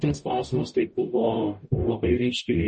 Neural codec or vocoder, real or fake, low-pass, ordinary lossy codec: codec, 44.1 kHz, 0.9 kbps, DAC; fake; 10.8 kHz; MP3, 32 kbps